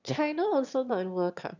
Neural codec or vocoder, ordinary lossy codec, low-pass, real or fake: autoencoder, 22.05 kHz, a latent of 192 numbers a frame, VITS, trained on one speaker; none; 7.2 kHz; fake